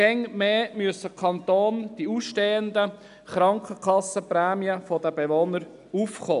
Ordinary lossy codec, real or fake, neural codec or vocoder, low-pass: none; real; none; 10.8 kHz